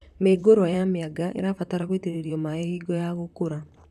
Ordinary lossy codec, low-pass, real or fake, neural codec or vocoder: none; 14.4 kHz; fake; vocoder, 44.1 kHz, 128 mel bands, Pupu-Vocoder